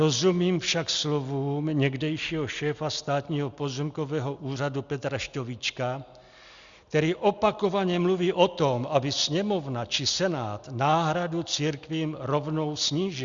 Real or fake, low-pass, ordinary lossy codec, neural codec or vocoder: real; 7.2 kHz; Opus, 64 kbps; none